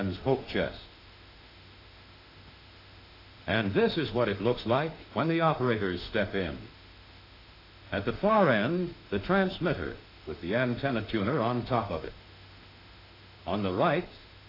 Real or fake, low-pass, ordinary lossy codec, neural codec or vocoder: fake; 5.4 kHz; AAC, 32 kbps; autoencoder, 48 kHz, 32 numbers a frame, DAC-VAE, trained on Japanese speech